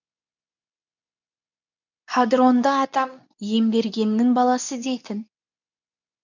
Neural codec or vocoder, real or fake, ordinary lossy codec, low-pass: codec, 24 kHz, 0.9 kbps, WavTokenizer, medium speech release version 2; fake; none; 7.2 kHz